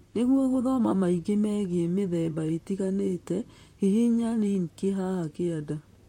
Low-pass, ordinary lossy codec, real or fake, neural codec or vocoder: 19.8 kHz; MP3, 64 kbps; fake; vocoder, 44.1 kHz, 128 mel bands, Pupu-Vocoder